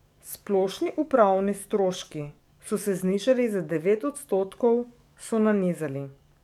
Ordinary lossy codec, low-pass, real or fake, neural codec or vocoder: none; 19.8 kHz; fake; codec, 44.1 kHz, 7.8 kbps, DAC